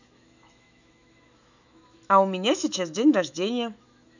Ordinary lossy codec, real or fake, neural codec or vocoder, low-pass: none; fake; autoencoder, 48 kHz, 128 numbers a frame, DAC-VAE, trained on Japanese speech; 7.2 kHz